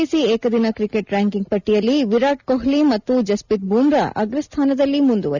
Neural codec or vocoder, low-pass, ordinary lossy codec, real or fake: none; 7.2 kHz; none; real